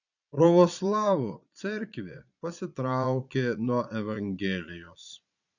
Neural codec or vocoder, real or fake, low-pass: vocoder, 22.05 kHz, 80 mel bands, Vocos; fake; 7.2 kHz